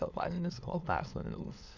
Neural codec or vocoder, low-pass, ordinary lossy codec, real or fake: autoencoder, 22.05 kHz, a latent of 192 numbers a frame, VITS, trained on many speakers; 7.2 kHz; none; fake